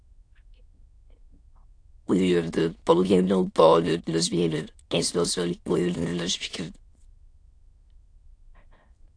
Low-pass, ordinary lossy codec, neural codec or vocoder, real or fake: 9.9 kHz; AAC, 48 kbps; autoencoder, 22.05 kHz, a latent of 192 numbers a frame, VITS, trained on many speakers; fake